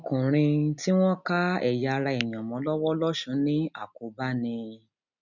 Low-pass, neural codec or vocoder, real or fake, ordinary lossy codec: 7.2 kHz; none; real; none